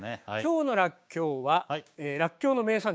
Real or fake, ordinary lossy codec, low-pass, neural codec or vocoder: fake; none; none; codec, 16 kHz, 6 kbps, DAC